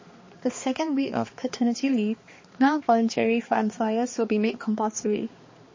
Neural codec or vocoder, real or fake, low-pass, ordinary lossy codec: codec, 16 kHz, 2 kbps, X-Codec, HuBERT features, trained on balanced general audio; fake; 7.2 kHz; MP3, 32 kbps